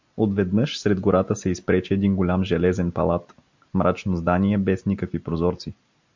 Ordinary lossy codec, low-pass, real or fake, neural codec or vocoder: MP3, 64 kbps; 7.2 kHz; real; none